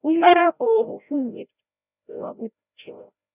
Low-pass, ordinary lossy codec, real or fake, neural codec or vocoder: 3.6 kHz; none; fake; codec, 16 kHz, 0.5 kbps, FreqCodec, larger model